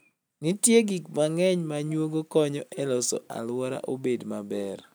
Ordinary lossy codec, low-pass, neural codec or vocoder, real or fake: none; none; none; real